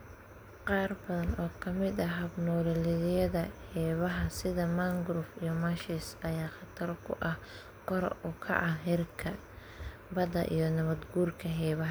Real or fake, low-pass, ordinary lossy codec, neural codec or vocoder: real; none; none; none